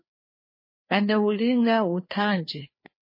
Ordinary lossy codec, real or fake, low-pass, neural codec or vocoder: MP3, 24 kbps; fake; 5.4 kHz; codec, 16 kHz, 2 kbps, FreqCodec, larger model